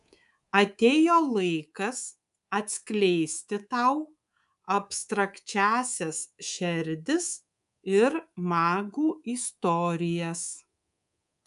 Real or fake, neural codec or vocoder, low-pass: fake; codec, 24 kHz, 3.1 kbps, DualCodec; 10.8 kHz